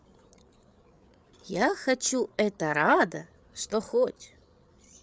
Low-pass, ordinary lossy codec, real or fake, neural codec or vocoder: none; none; fake; codec, 16 kHz, 8 kbps, FreqCodec, larger model